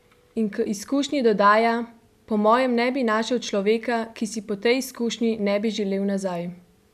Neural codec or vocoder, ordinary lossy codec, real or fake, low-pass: none; none; real; 14.4 kHz